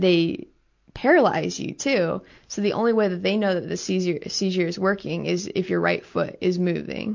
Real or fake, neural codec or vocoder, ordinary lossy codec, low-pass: real; none; MP3, 48 kbps; 7.2 kHz